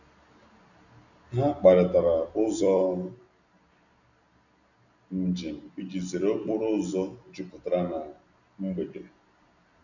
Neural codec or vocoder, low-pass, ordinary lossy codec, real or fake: none; 7.2 kHz; none; real